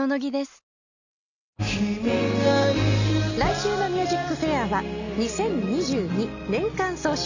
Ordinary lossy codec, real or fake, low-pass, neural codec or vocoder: none; real; 7.2 kHz; none